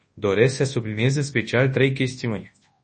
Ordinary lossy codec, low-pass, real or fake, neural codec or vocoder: MP3, 32 kbps; 10.8 kHz; fake; codec, 24 kHz, 0.9 kbps, WavTokenizer, large speech release